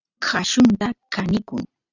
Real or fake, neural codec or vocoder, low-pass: real; none; 7.2 kHz